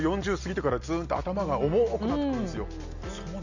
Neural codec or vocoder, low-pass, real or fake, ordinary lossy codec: none; 7.2 kHz; real; none